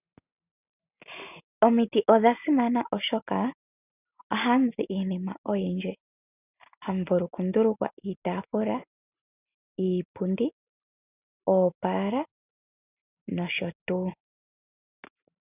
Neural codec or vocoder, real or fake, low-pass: none; real; 3.6 kHz